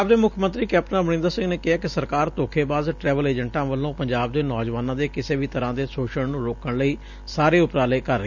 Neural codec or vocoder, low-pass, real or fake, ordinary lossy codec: none; 7.2 kHz; real; none